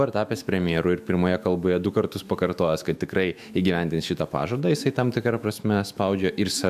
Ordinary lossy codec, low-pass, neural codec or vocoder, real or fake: AAC, 96 kbps; 14.4 kHz; autoencoder, 48 kHz, 128 numbers a frame, DAC-VAE, trained on Japanese speech; fake